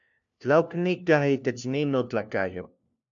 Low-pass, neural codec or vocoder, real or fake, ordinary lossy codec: 7.2 kHz; codec, 16 kHz, 1 kbps, FunCodec, trained on LibriTTS, 50 frames a second; fake; MP3, 64 kbps